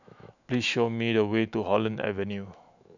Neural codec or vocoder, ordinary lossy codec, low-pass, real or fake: autoencoder, 48 kHz, 128 numbers a frame, DAC-VAE, trained on Japanese speech; none; 7.2 kHz; fake